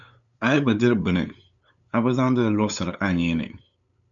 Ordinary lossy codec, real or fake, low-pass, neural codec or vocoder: MP3, 96 kbps; fake; 7.2 kHz; codec, 16 kHz, 8 kbps, FunCodec, trained on LibriTTS, 25 frames a second